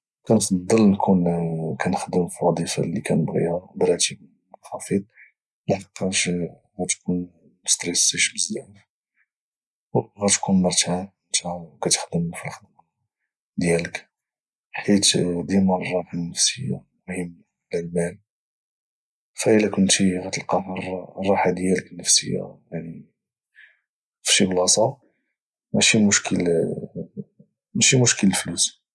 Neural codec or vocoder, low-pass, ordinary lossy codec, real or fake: none; none; none; real